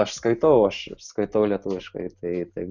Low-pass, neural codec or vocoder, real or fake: 7.2 kHz; none; real